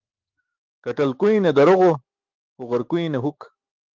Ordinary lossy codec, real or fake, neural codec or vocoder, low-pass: Opus, 32 kbps; fake; autoencoder, 48 kHz, 128 numbers a frame, DAC-VAE, trained on Japanese speech; 7.2 kHz